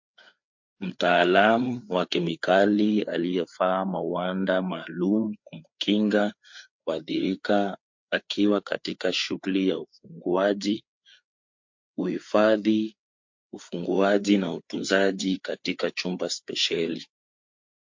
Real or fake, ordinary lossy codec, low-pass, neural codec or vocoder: fake; MP3, 48 kbps; 7.2 kHz; codec, 16 kHz, 4 kbps, FreqCodec, larger model